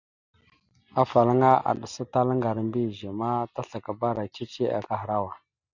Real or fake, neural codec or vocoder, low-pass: real; none; 7.2 kHz